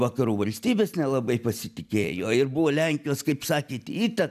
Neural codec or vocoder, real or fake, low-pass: none; real; 14.4 kHz